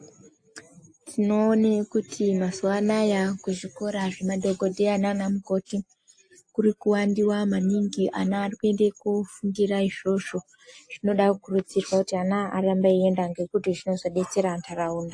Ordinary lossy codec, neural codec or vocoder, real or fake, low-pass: AAC, 48 kbps; none; real; 9.9 kHz